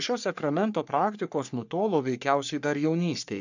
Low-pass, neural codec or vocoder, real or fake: 7.2 kHz; codec, 44.1 kHz, 3.4 kbps, Pupu-Codec; fake